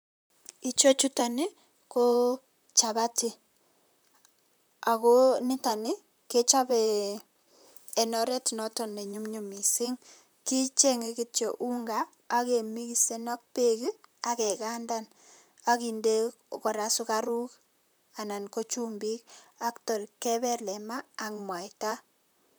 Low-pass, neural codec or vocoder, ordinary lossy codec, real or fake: none; vocoder, 44.1 kHz, 128 mel bands, Pupu-Vocoder; none; fake